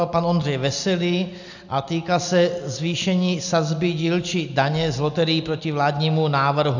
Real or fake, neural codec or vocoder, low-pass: real; none; 7.2 kHz